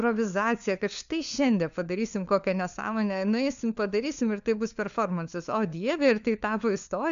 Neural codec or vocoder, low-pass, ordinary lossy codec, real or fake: codec, 16 kHz, 4 kbps, FunCodec, trained on LibriTTS, 50 frames a second; 7.2 kHz; AAC, 96 kbps; fake